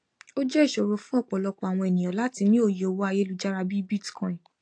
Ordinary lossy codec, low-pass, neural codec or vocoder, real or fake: AAC, 48 kbps; 9.9 kHz; none; real